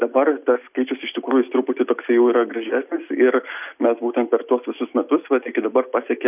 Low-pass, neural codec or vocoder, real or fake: 3.6 kHz; none; real